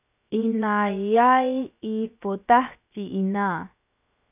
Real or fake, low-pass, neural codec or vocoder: fake; 3.6 kHz; codec, 16 kHz, 0.7 kbps, FocalCodec